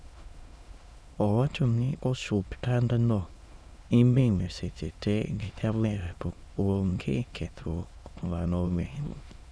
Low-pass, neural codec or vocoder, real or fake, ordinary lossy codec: none; autoencoder, 22.05 kHz, a latent of 192 numbers a frame, VITS, trained on many speakers; fake; none